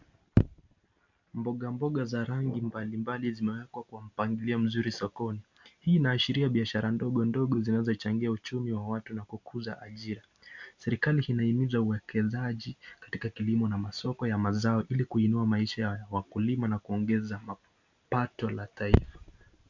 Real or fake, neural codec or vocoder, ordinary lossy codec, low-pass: real; none; MP3, 64 kbps; 7.2 kHz